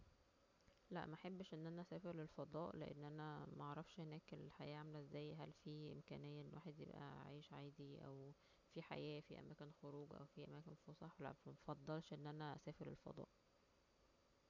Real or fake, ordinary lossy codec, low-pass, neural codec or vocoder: real; none; 7.2 kHz; none